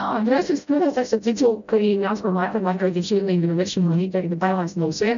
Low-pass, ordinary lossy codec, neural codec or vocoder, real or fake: 7.2 kHz; MP3, 96 kbps; codec, 16 kHz, 0.5 kbps, FreqCodec, smaller model; fake